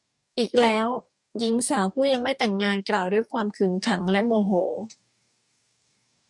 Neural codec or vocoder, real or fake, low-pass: codec, 44.1 kHz, 2.6 kbps, DAC; fake; 10.8 kHz